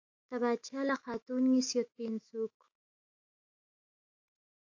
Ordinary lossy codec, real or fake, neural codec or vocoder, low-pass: AAC, 48 kbps; fake; autoencoder, 48 kHz, 128 numbers a frame, DAC-VAE, trained on Japanese speech; 7.2 kHz